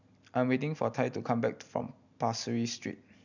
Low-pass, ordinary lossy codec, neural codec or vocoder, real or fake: 7.2 kHz; none; none; real